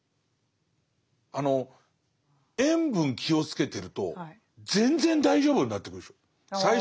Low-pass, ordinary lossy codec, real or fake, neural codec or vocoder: none; none; real; none